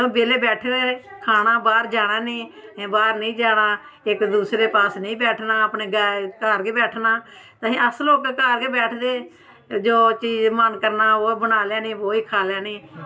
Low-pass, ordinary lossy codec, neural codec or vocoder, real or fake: none; none; none; real